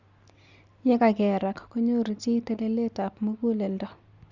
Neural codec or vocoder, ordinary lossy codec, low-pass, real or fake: none; Opus, 64 kbps; 7.2 kHz; real